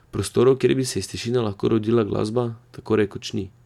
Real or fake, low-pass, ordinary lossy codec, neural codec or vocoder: real; 19.8 kHz; none; none